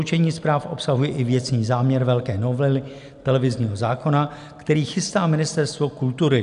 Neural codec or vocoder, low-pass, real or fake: none; 10.8 kHz; real